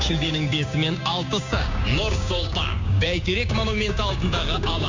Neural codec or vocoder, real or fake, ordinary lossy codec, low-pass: codec, 16 kHz, 6 kbps, DAC; fake; MP3, 64 kbps; 7.2 kHz